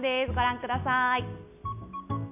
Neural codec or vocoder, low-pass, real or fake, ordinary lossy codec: none; 3.6 kHz; real; none